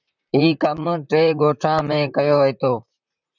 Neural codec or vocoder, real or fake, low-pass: vocoder, 44.1 kHz, 128 mel bands, Pupu-Vocoder; fake; 7.2 kHz